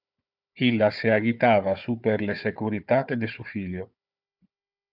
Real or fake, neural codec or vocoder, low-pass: fake; codec, 16 kHz, 4 kbps, FunCodec, trained on Chinese and English, 50 frames a second; 5.4 kHz